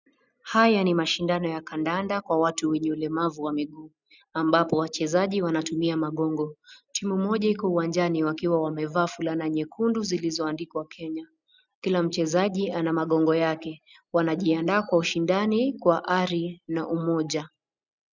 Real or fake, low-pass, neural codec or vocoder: real; 7.2 kHz; none